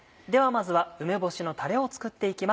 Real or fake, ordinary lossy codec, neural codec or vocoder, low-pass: real; none; none; none